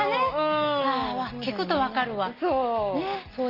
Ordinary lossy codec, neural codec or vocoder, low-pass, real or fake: Opus, 32 kbps; none; 5.4 kHz; real